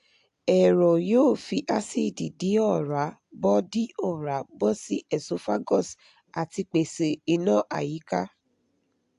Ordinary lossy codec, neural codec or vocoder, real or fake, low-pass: AAC, 64 kbps; none; real; 10.8 kHz